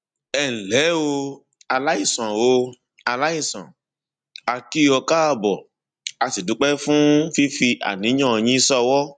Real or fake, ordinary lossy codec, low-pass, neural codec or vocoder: real; none; 9.9 kHz; none